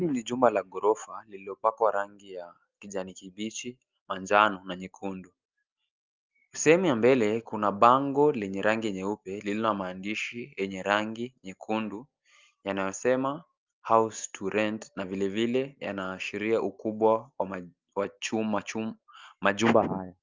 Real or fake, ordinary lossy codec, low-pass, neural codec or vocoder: real; Opus, 24 kbps; 7.2 kHz; none